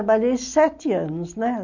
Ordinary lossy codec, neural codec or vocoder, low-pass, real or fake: none; none; 7.2 kHz; real